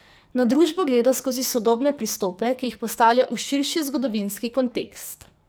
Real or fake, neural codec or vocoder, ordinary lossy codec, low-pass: fake; codec, 44.1 kHz, 2.6 kbps, SNAC; none; none